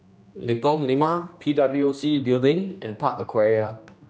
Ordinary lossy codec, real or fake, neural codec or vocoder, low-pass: none; fake; codec, 16 kHz, 1 kbps, X-Codec, HuBERT features, trained on general audio; none